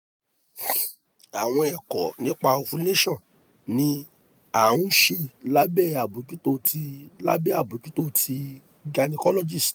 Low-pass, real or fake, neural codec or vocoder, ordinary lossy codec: none; real; none; none